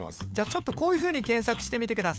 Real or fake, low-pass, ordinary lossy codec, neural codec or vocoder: fake; none; none; codec, 16 kHz, 4 kbps, FunCodec, trained on LibriTTS, 50 frames a second